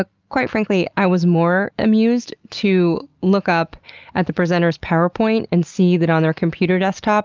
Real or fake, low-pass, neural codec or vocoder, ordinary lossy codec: real; 7.2 kHz; none; Opus, 24 kbps